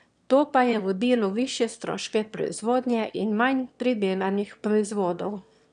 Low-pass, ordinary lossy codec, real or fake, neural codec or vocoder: 9.9 kHz; none; fake; autoencoder, 22.05 kHz, a latent of 192 numbers a frame, VITS, trained on one speaker